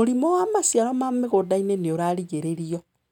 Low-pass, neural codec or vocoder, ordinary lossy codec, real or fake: 19.8 kHz; none; none; real